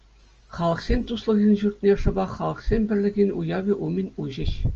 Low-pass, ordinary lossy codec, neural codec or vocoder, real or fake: 7.2 kHz; Opus, 24 kbps; none; real